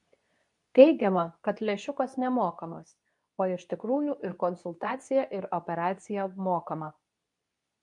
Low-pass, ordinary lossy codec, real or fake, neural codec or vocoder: 10.8 kHz; MP3, 64 kbps; fake; codec, 24 kHz, 0.9 kbps, WavTokenizer, medium speech release version 2